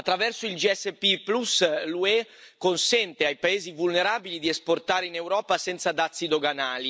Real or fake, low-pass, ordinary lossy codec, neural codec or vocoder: real; none; none; none